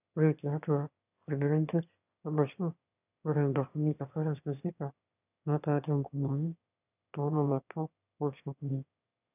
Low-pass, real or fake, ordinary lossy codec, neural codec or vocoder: 3.6 kHz; fake; none; autoencoder, 22.05 kHz, a latent of 192 numbers a frame, VITS, trained on one speaker